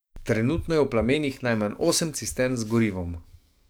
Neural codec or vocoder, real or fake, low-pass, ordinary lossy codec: codec, 44.1 kHz, 7.8 kbps, DAC; fake; none; none